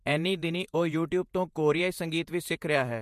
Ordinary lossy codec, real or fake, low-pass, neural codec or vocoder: MP3, 64 kbps; fake; 14.4 kHz; vocoder, 48 kHz, 128 mel bands, Vocos